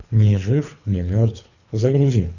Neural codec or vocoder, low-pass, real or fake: codec, 24 kHz, 3 kbps, HILCodec; 7.2 kHz; fake